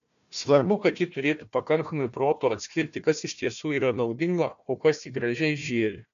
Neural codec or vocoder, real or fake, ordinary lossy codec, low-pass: codec, 16 kHz, 1 kbps, FunCodec, trained on Chinese and English, 50 frames a second; fake; MP3, 96 kbps; 7.2 kHz